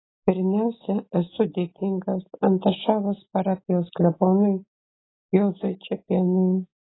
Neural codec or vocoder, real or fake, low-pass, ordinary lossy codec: none; real; 7.2 kHz; AAC, 16 kbps